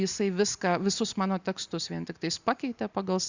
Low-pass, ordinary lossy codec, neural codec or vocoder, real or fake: 7.2 kHz; Opus, 64 kbps; none; real